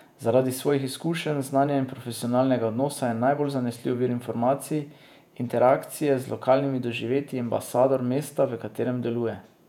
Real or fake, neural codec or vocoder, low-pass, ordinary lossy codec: fake; vocoder, 48 kHz, 128 mel bands, Vocos; 19.8 kHz; none